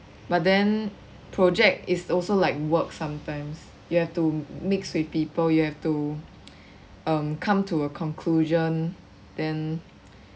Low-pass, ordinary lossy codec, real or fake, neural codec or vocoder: none; none; real; none